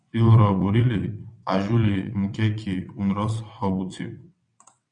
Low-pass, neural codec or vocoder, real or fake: 9.9 kHz; vocoder, 22.05 kHz, 80 mel bands, WaveNeXt; fake